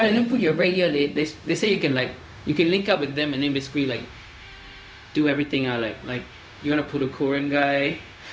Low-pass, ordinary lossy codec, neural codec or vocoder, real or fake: none; none; codec, 16 kHz, 0.4 kbps, LongCat-Audio-Codec; fake